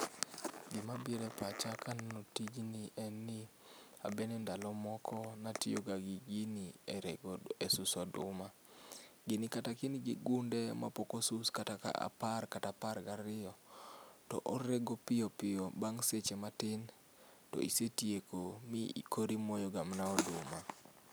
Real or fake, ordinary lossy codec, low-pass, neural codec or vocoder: real; none; none; none